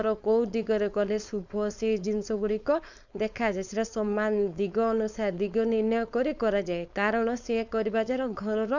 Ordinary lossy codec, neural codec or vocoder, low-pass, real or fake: none; codec, 16 kHz, 4.8 kbps, FACodec; 7.2 kHz; fake